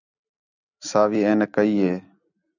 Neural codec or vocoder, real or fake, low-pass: none; real; 7.2 kHz